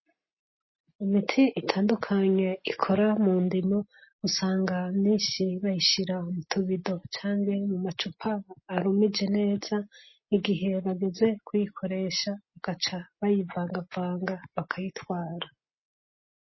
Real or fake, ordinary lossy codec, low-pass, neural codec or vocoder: real; MP3, 24 kbps; 7.2 kHz; none